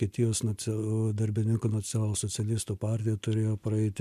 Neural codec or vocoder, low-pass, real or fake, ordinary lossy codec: none; 14.4 kHz; real; MP3, 96 kbps